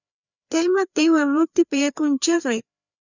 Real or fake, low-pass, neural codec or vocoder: fake; 7.2 kHz; codec, 16 kHz, 2 kbps, FreqCodec, larger model